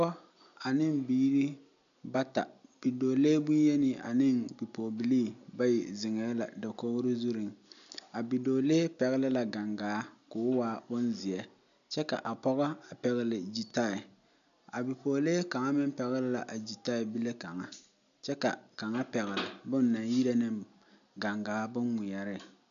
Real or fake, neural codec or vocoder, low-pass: real; none; 7.2 kHz